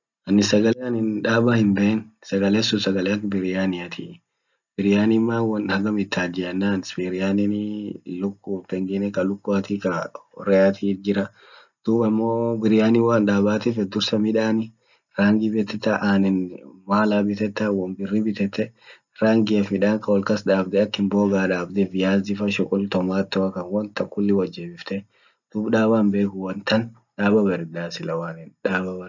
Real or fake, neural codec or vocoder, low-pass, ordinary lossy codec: real; none; 7.2 kHz; none